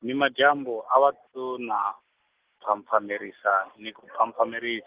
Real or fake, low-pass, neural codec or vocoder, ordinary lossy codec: real; 3.6 kHz; none; Opus, 16 kbps